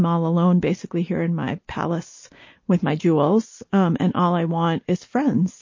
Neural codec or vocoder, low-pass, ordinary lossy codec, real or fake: none; 7.2 kHz; MP3, 32 kbps; real